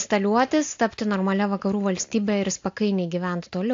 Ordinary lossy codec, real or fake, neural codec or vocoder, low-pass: AAC, 48 kbps; real; none; 7.2 kHz